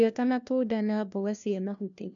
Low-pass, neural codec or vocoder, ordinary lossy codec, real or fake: 7.2 kHz; codec, 16 kHz, 1 kbps, FunCodec, trained on LibriTTS, 50 frames a second; none; fake